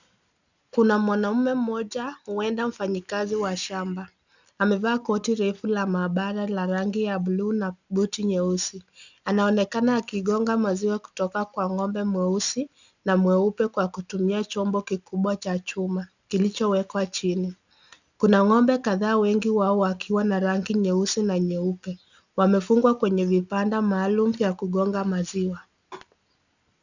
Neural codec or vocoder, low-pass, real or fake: none; 7.2 kHz; real